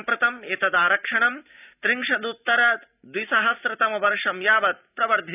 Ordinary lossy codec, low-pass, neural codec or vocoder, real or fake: none; 3.6 kHz; none; real